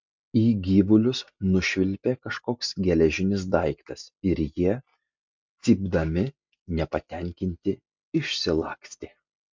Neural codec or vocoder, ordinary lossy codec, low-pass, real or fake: none; MP3, 64 kbps; 7.2 kHz; real